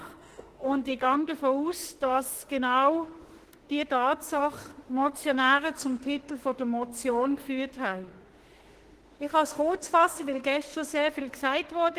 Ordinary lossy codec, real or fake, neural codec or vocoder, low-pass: Opus, 16 kbps; fake; autoencoder, 48 kHz, 32 numbers a frame, DAC-VAE, trained on Japanese speech; 14.4 kHz